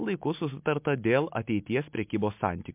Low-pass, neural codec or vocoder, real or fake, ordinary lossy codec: 3.6 kHz; none; real; MP3, 32 kbps